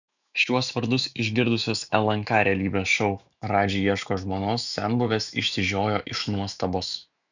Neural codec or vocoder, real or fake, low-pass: autoencoder, 48 kHz, 128 numbers a frame, DAC-VAE, trained on Japanese speech; fake; 7.2 kHz